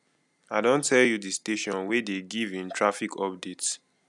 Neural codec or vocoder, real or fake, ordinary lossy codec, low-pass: none; real; none; 10.8 kHz